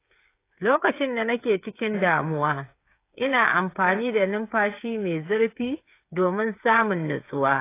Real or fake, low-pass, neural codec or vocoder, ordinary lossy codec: fake; 3.6 kHz; codec, 16 kHz, 8 kbps, FreqCodec, smaller model; AAC, 24 kbps